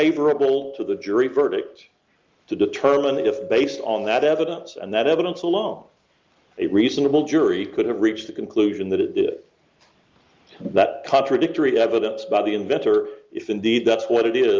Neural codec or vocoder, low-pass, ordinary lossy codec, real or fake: none; 7.2 kHz; Opus, 32 kbps; real